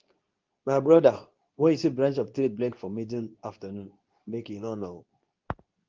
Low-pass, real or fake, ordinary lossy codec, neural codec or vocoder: 7.2 kHz; fake; Opus, 32 kbps; codec, 24 kHz, 0.9 kbps, WavTokenizer, medium speech release version 2